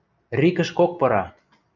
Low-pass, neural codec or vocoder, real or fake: 7.2 kHz; none; real